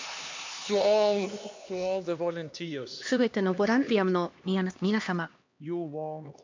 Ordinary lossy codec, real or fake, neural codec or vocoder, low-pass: MP3, 48 kbps; fake; codec, 16 kHz, 2 kbps, X-Codec, HuBERT features, trained on LibriSpeech; 7.2 kHz